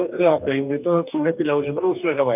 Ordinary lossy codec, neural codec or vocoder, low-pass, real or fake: none; codec, 44.1 kHz, 2.6 kbps, DAC; 3.6 kHz; fake